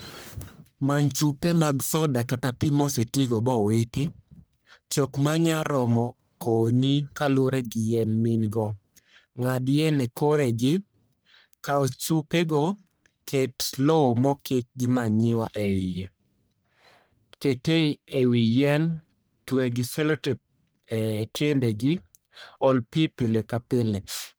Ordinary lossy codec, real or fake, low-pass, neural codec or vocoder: none; fake; none; codec, 44.1 kHz, 1.7 kbps, Pupu-Codec